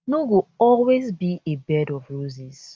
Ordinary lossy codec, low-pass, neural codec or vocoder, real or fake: none; none; none; real